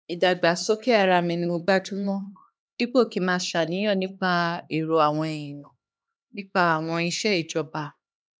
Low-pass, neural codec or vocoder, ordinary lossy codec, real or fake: none; codec, 16 kHz, 2 kbps, X-Codec, HuBERT features, trained on LibriSpeech; none; fake